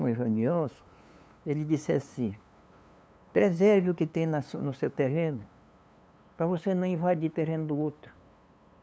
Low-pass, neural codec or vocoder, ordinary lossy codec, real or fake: none; codec, 16 kHz, 2 kbps, FunCodec, trained on LibriTTS, 25 frames a second; none; fake